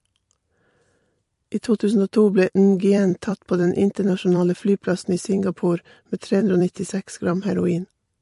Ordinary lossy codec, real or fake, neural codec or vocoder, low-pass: MP3, 48 kbps; fake; vocoder, 44.1 kHz, 128 mel bands every 256 samples, BigVGAN v2; 14.4 kHz